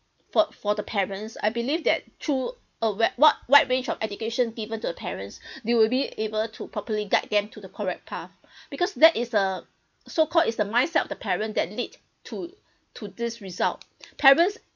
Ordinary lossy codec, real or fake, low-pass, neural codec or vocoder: none; real; 7.2 kHz; none